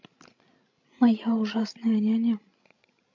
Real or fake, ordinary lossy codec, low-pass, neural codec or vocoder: fake; MP3, 48 kbps; 7.2 kHz; codec, 16 kHz, 16 kbps, FreqCodec, larger model